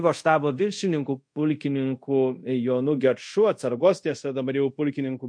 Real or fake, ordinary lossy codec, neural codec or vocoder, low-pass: fake; MP3, 48 kbps; codec, 24 kHz, 0.5 kbps, DualCodec; 9.9 kHz